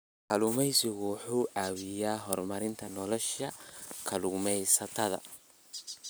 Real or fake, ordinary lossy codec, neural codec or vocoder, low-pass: real; none; none; none